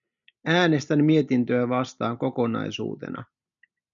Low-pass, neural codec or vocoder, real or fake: 7.2 kHz; none; real